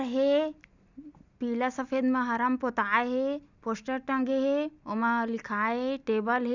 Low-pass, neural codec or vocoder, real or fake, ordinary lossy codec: 7.2 kHz; none; real; none